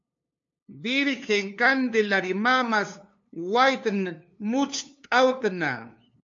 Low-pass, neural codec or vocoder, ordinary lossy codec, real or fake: 7.2 kHz; codec, 16 kHz, 2 kbps, FunCodec, trained on LibriTTS, 25 frames a second; MP3, 48 kbps; fake